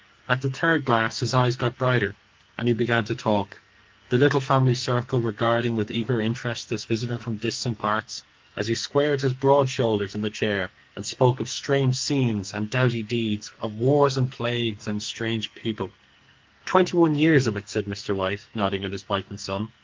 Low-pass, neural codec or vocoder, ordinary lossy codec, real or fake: 7.2 kHz; codec, 44.1 kHz, 2.6 kbps, SNAC; Opus, 32 kbps; fake